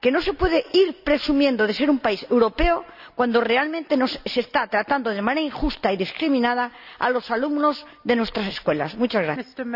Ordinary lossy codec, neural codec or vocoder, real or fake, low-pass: none; none; real; 5.4 kHz